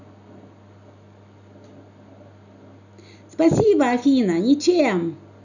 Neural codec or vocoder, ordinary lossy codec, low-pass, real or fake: none; none; 7.2 kHz; real